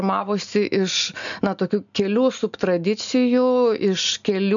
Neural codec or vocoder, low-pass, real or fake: none; 7.2 kHz; real